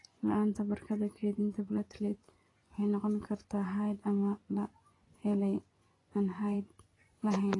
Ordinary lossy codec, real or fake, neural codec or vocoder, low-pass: AAC, 32 kbps; fake; vocoder, 24 kHz, 100 mel bands, Vocos; 10.8 kHz